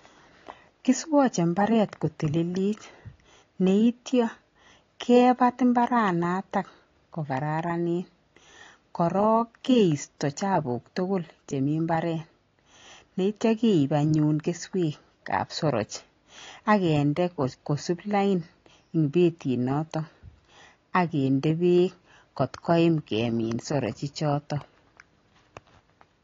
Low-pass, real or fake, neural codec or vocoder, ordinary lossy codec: 7.2 kHz; real; none; AAC, 32 kbps